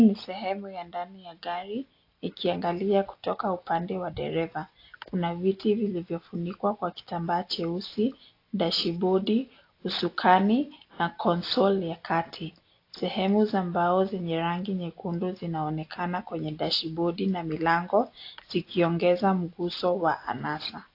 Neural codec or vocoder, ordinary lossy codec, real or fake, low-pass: none; AAC, 32 kbps; real; 5.4 kHz